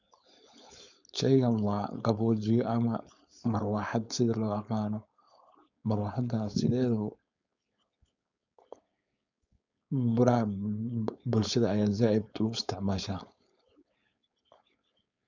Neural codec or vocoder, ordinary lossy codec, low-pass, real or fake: codec, 16 kHz, 4.8 kbps, FACodec; none; 7.2 kHz; fake